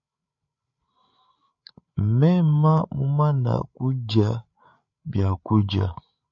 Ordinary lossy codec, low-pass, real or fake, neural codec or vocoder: MP3, 48 kbps; 7.2 kHz; fake; codec, 16 kHz, 8 kbps, FreqCodec, larger model